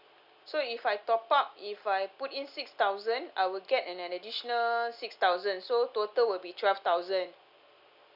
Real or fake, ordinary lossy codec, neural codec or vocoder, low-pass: real; none; none; 5.4 kHz